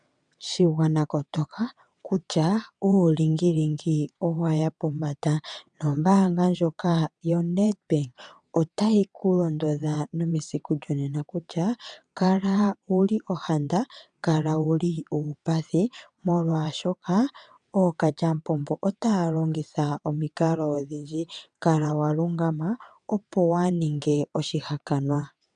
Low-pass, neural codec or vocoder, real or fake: 9.9 kHz; vocoder, 22.05 kHz, 80 mel bands, WaveNeXt; fake